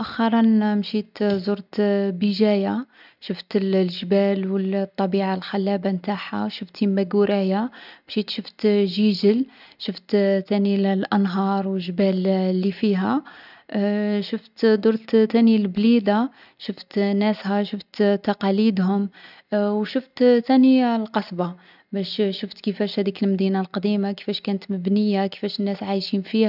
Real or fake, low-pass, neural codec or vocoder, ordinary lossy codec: real; 5.4 kHz; none; none